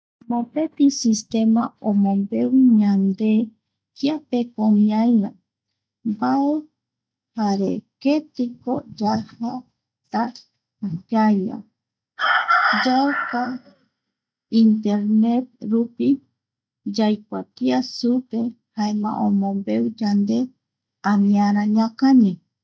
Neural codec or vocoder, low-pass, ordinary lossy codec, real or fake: none; none; none; real